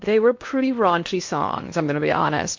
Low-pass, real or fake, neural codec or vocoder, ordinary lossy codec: 7.2 kHz; fake; codec, 16 kHz in and 24 kHz out, 0.6 kbps, FocalCodec, streaming, 4096 codes; MP3, 48 kbps